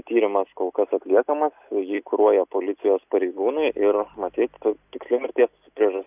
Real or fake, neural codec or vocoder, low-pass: real; none; 3.6 kHz